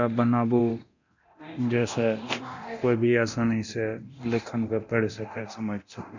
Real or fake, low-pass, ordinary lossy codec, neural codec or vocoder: fake; 7.2 kHz; none; codec, 24 kHz, 0.9 kbps, DualCodec